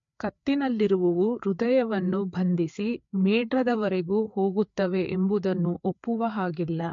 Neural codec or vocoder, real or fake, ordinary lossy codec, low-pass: codec, 16 kHz, 4 kbps, FreqCodec, larger model; fake; MP3, 48 kbps; 7.2 kHz